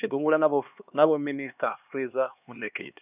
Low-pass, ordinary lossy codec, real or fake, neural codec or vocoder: 3.6 kHz; none; fake; codec, 16 kHz, 2 kbps, X-Codec, HuBERT features, trained on LibriSpeech